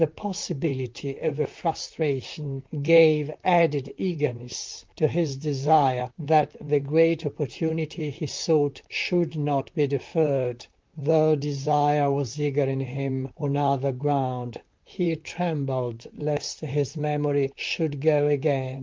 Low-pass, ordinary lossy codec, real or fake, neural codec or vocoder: 7.2 kHz; Opus, 24 kbps; fake; vocoder, 44.1 kHz, 128 mel bands, Pupu-Vocoder